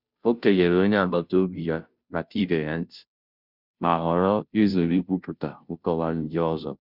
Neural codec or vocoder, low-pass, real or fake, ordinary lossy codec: codec, 16 kHz, 0.5 kbps, FunCodec, trained on Chinese and English, 25 frames a second; 5.4 kHz; fake; none